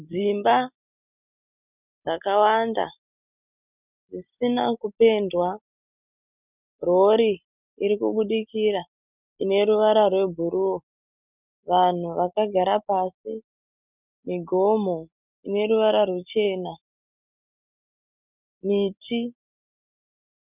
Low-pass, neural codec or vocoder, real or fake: 3.6 kHz; none; real